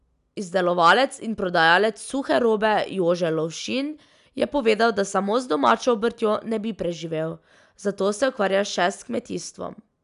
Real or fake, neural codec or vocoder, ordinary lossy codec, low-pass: real; none; none; 10.8 kHz